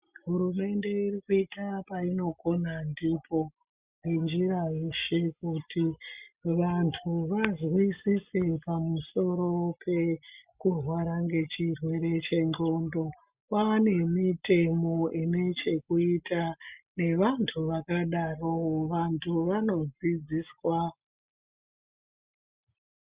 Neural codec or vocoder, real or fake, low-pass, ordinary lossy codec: none; real; 3.6 kHz; Opus, 64 kbps